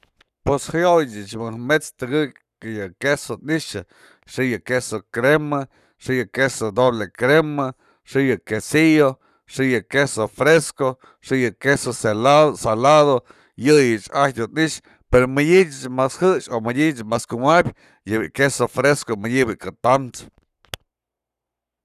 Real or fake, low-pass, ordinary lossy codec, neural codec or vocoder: fake; 14.4 kHz; none; codec, 44.1 kHz, 7.8 kbps, Pupu-Codec